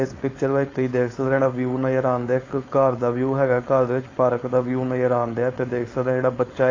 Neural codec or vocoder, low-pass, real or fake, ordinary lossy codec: codec, 16 kHz, 4.8 kbps, FACodec; 7.2 kHz; fake; AAC, 32 kbps